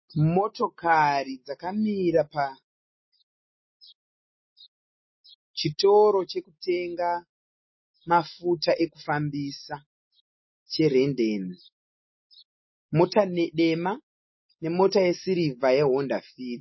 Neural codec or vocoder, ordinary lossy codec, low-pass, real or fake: none; MP3, 24 kbps; 7.2 kHz; real